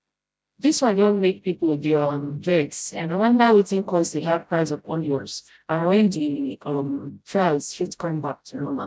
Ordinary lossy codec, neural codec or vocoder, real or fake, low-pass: none; codec, 16 kHz, 0.5 kbps, FreqCodec, smaller model; fake; none